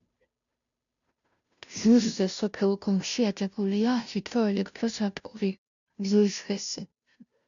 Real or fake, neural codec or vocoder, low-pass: fake; codec, 16 kHz, 0.5 kbps, FunCodec, trained on Chinese and English, 25 frames a second; 7.2 kHz